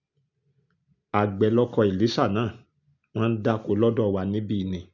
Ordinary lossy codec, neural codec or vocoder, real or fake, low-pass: none; none; real; 7.2 kHz